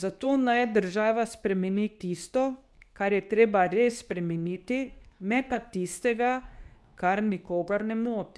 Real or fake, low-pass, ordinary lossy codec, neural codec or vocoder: fake; none; none; codec, 24 kHz, 0.9 kbps, WavTokenizer, medium speech release version 2